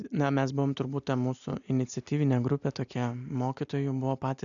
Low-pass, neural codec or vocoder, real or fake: 7.2 kHz; none; real